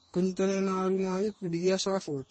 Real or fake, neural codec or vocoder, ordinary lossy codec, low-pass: fake; codec, 44.1 kHz, 2.6 kbps, DAC; MP3, 32 kbps; 10.8 kHz